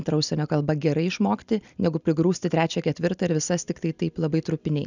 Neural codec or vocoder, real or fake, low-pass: none; real; 7.2 kHz